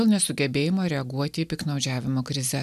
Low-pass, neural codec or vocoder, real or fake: 14.4 kHz; none; real